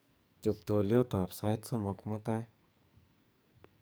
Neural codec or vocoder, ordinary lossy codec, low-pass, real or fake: codec, 44.1 kHz, 2.6 kbps, SNAC; none; none; fake